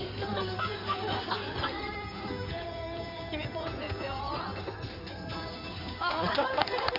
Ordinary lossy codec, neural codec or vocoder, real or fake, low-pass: none; codec, 16 kHz in and 24 kHz out, 2.2 kbps, FireRedTTS-2 codec; fake; 5.4 kHz